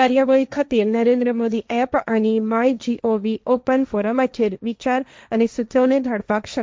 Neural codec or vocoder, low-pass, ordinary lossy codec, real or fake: codec, 16 kHz, 1.1 kbps, Voila-Tokenizer; none; none; fake